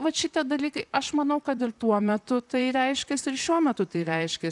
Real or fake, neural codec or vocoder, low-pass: fake; vocoder, 44.1 kHz, 128 mel bands, Pupu-Vocoder; 10.8 kHz